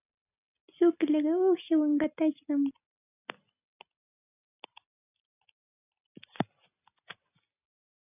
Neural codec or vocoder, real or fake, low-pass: none; real; 3.6 kHz